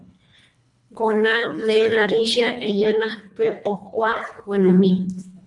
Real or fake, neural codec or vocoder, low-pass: fake; codec, 24 kHz, 1.5 kbps, HILCodec; 10.8 kHz